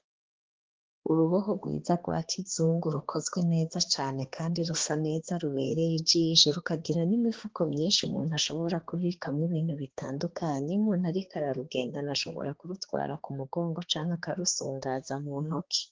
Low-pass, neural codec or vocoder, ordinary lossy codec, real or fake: 7.2 kHz; codec, 16 kHz, 2 kbps, X-Codec, HuBERT features, trained on balanced general audio; Opus, 16 kbps; fake